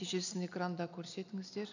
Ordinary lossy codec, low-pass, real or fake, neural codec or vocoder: none; 7.2 kHz; real; none